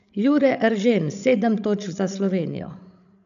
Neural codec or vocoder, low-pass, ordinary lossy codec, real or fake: codec, 16 kHz, 16 kbps, FreqCodec, larger model; 7.2 kHz; none; fake